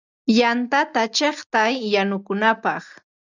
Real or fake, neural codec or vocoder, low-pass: real; none; 7.2 kHz